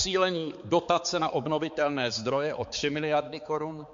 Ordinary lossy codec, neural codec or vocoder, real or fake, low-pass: MP3, 48 kbps; codec, 16 kHz, 4 kbps, X-Codec, HuBERT features, trained on balanced general audio; fake; 7.2 kHz